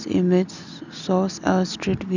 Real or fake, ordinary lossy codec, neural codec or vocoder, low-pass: real; none; none; 7.2 kHz